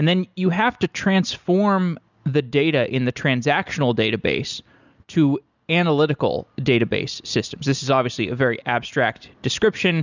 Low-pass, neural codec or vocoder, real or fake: 7.2 kHz; none; real